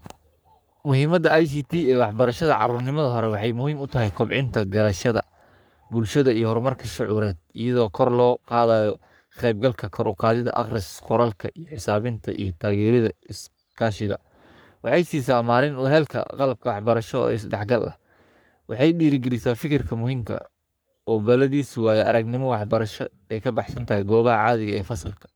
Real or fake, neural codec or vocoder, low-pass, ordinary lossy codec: fake; codec, 44.1 kHz, 3.4 kbps, Pupu-Codec; none; none